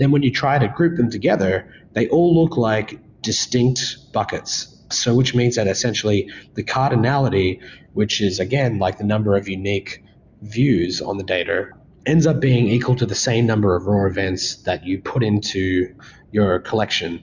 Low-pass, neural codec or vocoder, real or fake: 7.2 kHz; none; real